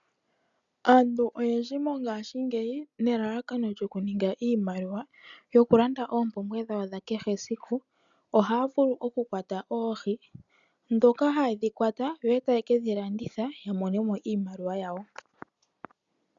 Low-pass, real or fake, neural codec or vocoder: 7.2 kHz; real; none